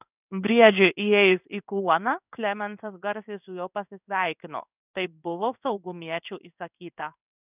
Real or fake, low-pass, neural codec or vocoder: fake; 3.6 kHz; codec, 16 kHz in and 24 kHz out, 1 kbps, XY-Tokenizer